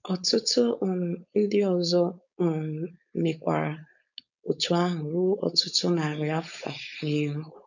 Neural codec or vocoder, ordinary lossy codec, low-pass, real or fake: codec, 16 kHz, 4.8 kbps, FACodec; none; 7.2 kHz; fake